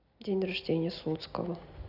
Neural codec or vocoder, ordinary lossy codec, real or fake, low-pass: none; none; real; 5.4 kHz